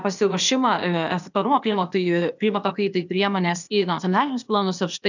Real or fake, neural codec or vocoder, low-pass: fake; codec, 16 kHz, 0.8 kbps, ZipCodec; 7.2 kHz